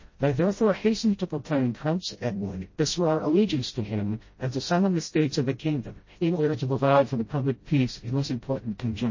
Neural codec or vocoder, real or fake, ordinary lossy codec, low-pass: codec, 16 kHz, 0.5 kbps, FreqCodec, smaller model; fake; MP3, 32 kbps; 7.2 kHz